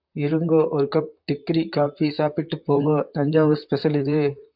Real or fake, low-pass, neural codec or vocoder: fake; 5.4 kHz; vocoder, 44.1 kHz, 128 mel bands, Pupu-Vocoder